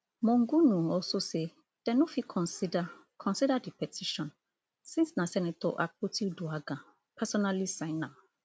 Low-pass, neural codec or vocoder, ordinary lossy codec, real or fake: none; none; none; real